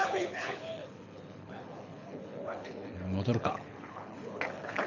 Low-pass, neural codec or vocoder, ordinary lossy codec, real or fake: 7.2 kHz; codec, 24 kHz, 6 kbps, HILCodec; none; fake